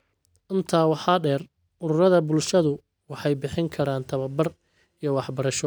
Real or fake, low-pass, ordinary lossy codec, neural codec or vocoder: real; none; none; none